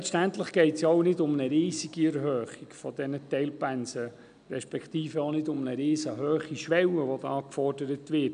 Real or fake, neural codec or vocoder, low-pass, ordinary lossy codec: real; none; 9.9 kHz; none